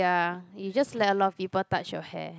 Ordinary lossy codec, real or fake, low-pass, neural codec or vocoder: none; real; none; none